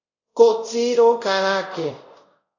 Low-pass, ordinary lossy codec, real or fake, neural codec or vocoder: 7.2 kHz; AAC, 48 kbps; fake; codec, 24 kHz, 0.5 kbps, DualCodec